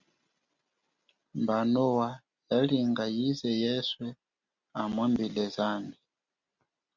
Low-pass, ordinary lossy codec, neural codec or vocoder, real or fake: 7.2 kHz; Opus, 64 kbps; none; real